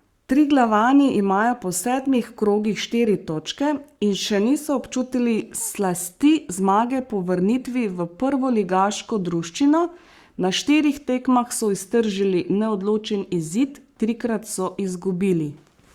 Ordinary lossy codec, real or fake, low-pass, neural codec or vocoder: Opus, 64 kbps; fake; 19.8 kHz; codec, 44.1 kHz, 7.8 kbps, Pupu-Codec